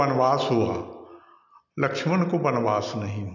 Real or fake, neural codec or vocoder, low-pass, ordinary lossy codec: real; none; 7.2 kHz; none